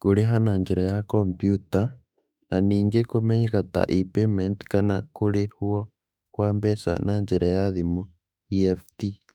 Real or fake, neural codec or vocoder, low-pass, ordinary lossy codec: fake; autoencoder, 48 kHz, 32 numbers a frame, DAC-VAE, trained on Japanese speech; 19.8 kHz; none